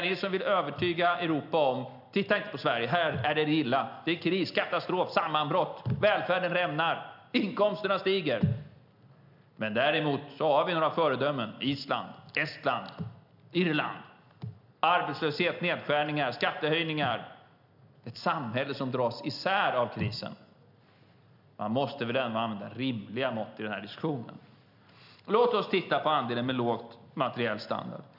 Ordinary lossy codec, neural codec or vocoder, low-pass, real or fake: none; none; 5.4 kHz; real